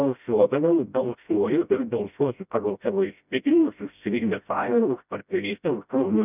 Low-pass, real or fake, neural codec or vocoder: 3.6 kHz; fake; codec, 16 kHz, 0.5 kbps, FreqCodec, smaller model